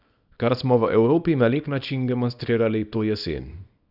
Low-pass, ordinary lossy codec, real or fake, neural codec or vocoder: 5.4 kHz; none; fake; codec, 24 kHz, 0.9 kbps, WavTokenizer, small release